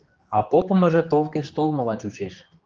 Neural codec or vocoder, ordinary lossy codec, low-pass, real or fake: codec, 16 kHz, 2 kbps, X-Codec, HuBERT features, trained on general audio; Opus, 24 kbps; 7.2 kHz; fake